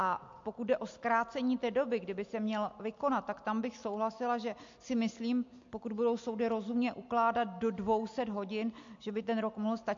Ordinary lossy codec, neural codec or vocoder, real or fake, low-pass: MP3, 48 kbps; none; real; 7.2 kHz